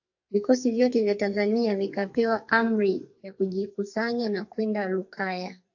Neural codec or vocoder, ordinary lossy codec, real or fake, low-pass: codec, 44.1 kHz, 2.6 kbps, SNAC; AAC, 48 kbps; fake; 7.2 kHz